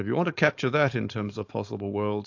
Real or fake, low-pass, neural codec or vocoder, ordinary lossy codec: fake; 7.2 kHz; codec, 16 kHz, 4.8 kbps, FACodec; AAC, 48 kbps